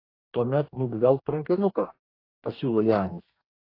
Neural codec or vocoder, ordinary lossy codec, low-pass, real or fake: codec, 44.1 kHz, 2.6 kbps, DAC; AAC, 32 kbps; 5.4 kHz; fake